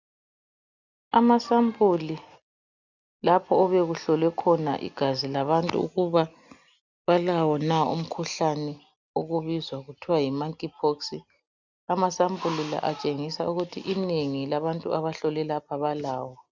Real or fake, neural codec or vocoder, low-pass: real; none; 7.2 kHz